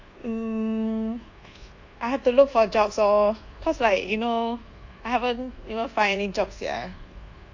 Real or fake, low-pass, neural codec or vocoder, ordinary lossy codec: fake; 7.2 kHz; codec, 24 kHz, 1.2 kbps, DualCodec; AAC, 48 kbps